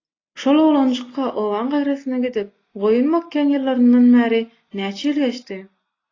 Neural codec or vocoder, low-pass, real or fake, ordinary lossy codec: none; 7.2 kHz; real; AAC, 32 kbps